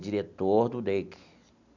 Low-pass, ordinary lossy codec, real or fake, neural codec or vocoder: 7.2 kHz; none; real; none